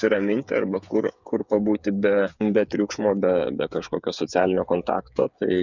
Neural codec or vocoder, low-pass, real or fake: codec, 16 kHz, 8 kbps, FreqCodec, smaller model; 7.2 kHz; fake